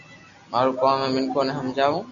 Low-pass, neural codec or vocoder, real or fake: 7.2 kHz; none; real